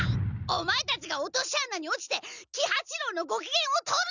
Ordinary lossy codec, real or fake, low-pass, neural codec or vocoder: none; real; 7.2 kHz; none